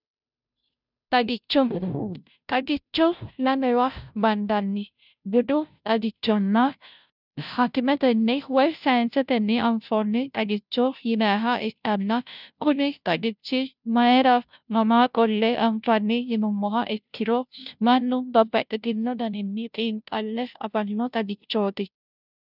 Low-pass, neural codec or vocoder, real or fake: 5.4 kHz; codec, 16 kHz, 0.5 kbps, FunCodec, trained on Chinese and English, 25 frames a second; fake